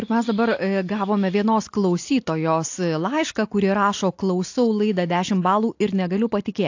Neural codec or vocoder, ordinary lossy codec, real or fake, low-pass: none; AAC, 48 kbps; real; 7.2 kHz